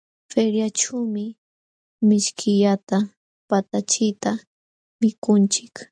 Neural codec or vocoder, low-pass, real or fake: none; 9.9 kHz; real